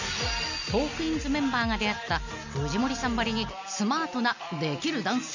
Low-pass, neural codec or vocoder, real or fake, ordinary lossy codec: 7.2 kHz; none; real; none